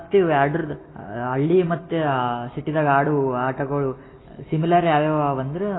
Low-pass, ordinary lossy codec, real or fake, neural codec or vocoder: 7.2 kHz; AAC, 16 kbps; real; none